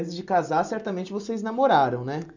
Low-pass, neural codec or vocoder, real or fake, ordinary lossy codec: 7.2 kHz; none; real; none